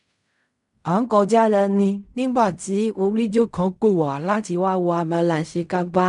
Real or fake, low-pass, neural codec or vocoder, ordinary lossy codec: fake; 10.8 kHz; codec, 16 kHz in and 24 kHz out, 0.4 kbps, LongCat-Audio-Codec, fine tuned four codebook decoder; none